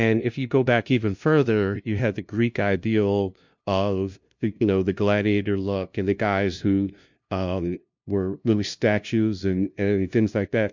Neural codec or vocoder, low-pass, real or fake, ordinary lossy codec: codec, 16 kHz, 0.5 kbps, FunCodec, trained on LibriTTS, 25 frames a second; 7.2 kHz; fake; MP3, 64 kbps